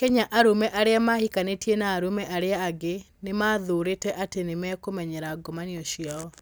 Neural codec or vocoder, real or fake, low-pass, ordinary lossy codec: none; real; none; none